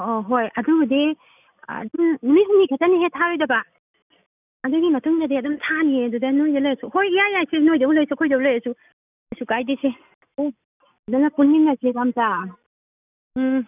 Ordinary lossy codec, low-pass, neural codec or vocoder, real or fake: none; 3.6 kHz; none; real